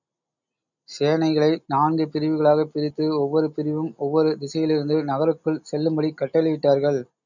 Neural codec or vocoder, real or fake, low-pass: none; real; 7.2 kHz